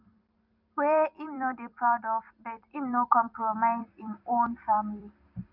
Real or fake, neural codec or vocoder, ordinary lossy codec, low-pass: fake; vocoder, 44.1 kHz, 128 mel bands every 256 samples, BigVGAN v2; none; 5.4 kHz